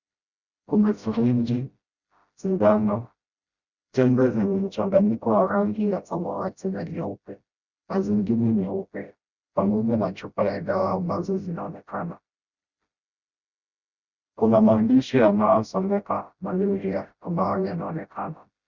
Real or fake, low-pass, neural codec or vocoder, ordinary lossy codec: fake; 7.2 kHz; codec, 16 kHz, 0.5 kbps, FreqCodec, smaller model; Opus, 64 kbps